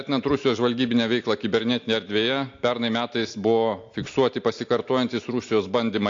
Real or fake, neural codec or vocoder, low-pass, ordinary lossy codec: real; none; 7.2 kHz; AAC, 48 kbps